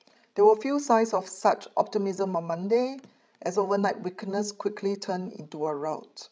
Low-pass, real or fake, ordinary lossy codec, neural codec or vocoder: none; fake; none; codec, 16 kHz, 16 kbps, FreqCodec, larger model